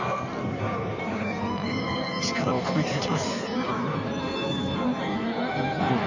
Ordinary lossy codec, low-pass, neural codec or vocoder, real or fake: none; 7.2 kHz; codec, 16 kHz in and 24 kHz out, 1.1 kbps, FireRedTTS-2 codec; fake